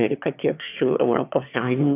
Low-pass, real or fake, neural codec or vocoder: 3.6 kHz; fake; autoencoder, 22.05 kHz, a latent of 192 numbers a frame, VITS, trained on one speaker